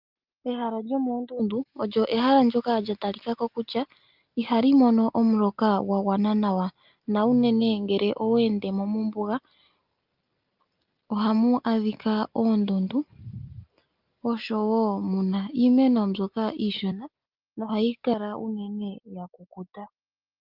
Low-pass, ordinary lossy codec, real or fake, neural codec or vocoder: 5.4 kHz; Opus, 24 kbps; real; none